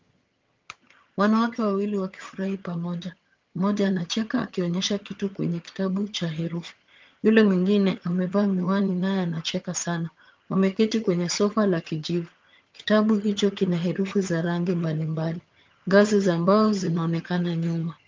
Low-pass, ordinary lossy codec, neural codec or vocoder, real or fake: 7.2 kHz; Opus, 24 kbps; vocoder, 22.05 kHz, 80 mel bands, HiFi-GAN; fake